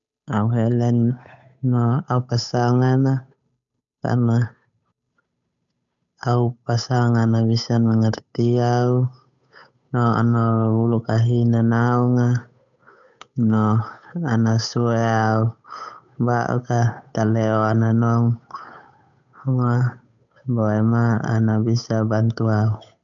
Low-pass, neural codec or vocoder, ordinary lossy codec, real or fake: 7.2 kHz; codec, 16 kHz, 8 kbps, FunCodec, trained on Chinese and English, 25 frames a second; none; fake